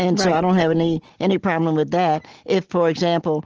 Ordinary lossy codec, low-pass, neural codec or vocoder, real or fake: Opus, 16 kbps; 7.2 kHz; none; real